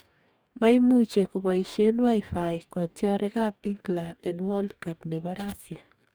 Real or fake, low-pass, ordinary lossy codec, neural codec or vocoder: fake; none; none; codec, 44.1 kHz, 2.6 kbps, DAC